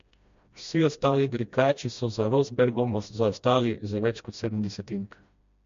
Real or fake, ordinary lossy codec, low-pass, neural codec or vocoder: fake; MP3, 48 kbps; 7.2 kHz; codec, 16 kHz, 1 kbps, FreqCodec, smaller model